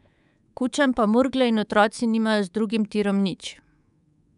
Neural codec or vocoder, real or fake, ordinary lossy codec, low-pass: codec, 24 kHz, 3.1 kbps, DualCodec; fake; none; 10.8 kHz